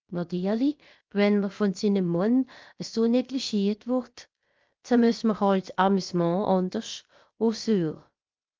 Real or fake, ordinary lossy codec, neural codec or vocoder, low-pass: fake; Opus, 32 kbps; codec, 16 kHz, about 1 kbps, DyCAST, with the encoder's durations; 7.2 kHz